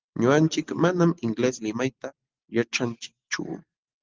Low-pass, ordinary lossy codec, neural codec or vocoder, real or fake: 7.2 kHz; Opus, 16 kbps; none; real